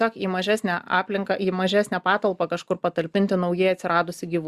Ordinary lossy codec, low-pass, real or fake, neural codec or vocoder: Opus, 64 kbps; 14.4 kHz; real; none